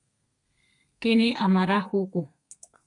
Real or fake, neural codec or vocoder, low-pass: fake; codec, 32 kHz, 1.9 kbps, SNAC; 10.8 kHz